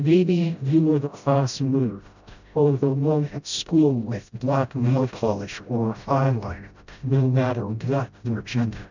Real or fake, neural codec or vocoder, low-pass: fake; codec, 16 kHz, 0.5 kbps, FreqCodec, smaller model; 7.2 kHz